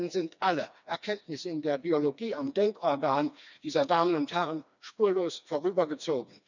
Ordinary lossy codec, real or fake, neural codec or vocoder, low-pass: none; fake; codec, 16 kHz, 2 kbps, FreqCodec, smaller model; 7.2 kHz